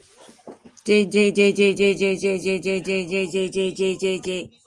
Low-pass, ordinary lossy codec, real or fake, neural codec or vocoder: 10.8 kHz; Opus, 24 kbps; real; none